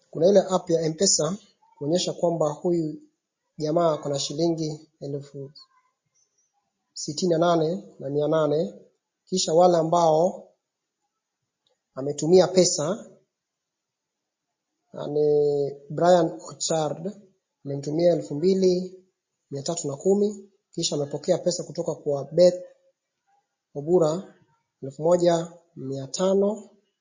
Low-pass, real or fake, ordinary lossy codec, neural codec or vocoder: 7.2 kHz; real; MP3, 32 kbps; none